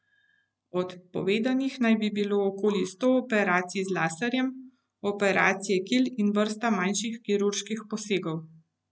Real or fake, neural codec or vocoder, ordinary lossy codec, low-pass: real; none; none; none